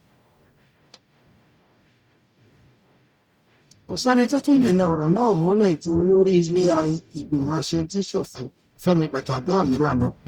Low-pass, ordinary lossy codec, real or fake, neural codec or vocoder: 19.8 kHz; Opus, 64 kbps; fake; codec, 44.1 kHz, 0.9 kbps, DAC